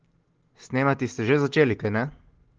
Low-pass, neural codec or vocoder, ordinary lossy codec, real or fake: 7.2 kHz; none; Opus, 16 kbps; real